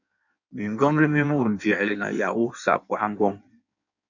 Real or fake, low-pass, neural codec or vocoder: fake; 7.2 kHz; codec, 16 kHz in and 24 kHz out, 1.1 kbps, FireRedTTS-2 codec